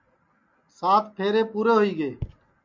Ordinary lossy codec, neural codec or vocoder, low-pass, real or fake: MP3, 48 kbps; none; 7.2 kHz; real